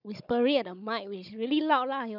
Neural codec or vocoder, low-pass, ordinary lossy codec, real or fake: codec, 16 kHz, 16 kbps, FunCodec, trained on Chinese and English, 50 frames a second; 5.4 kHz; none; fake